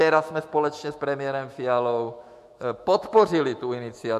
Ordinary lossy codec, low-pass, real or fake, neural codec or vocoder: MP3, 64 kbps; 14.4 kHz; fake; autoencoder, 48 kHz, 128 numbers a frame, DAC-VAE, trained on Japanese speech